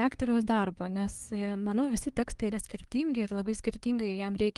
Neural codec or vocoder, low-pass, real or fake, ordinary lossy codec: codec, 24 kHz, 1 kbps, SNAC; 10.8 kHz; fake; Opus, 24 kbps